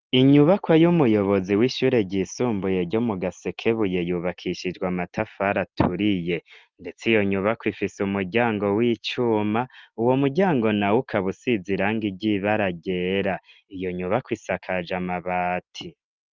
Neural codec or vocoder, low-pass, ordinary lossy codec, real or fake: none; 7.2 kHz; Opus, 32 kbps; real